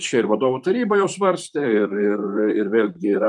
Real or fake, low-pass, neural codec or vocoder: fake; 10.8 kHz; vocoder, 44.1 kHz, 128 mel bands, Pupu-Vocoder